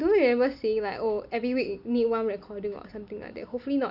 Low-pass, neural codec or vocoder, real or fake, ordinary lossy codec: 5.4 kHz; none; real; none